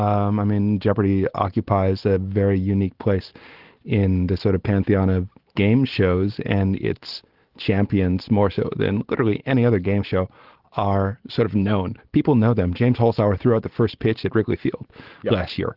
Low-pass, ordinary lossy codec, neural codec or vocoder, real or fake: 5.4 kHz; Opus, 16 kbps; none; real